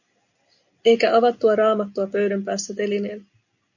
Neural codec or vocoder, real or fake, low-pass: none; real; 7.2 kHz